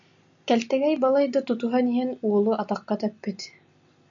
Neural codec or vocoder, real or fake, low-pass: none; real; 7.2 kHz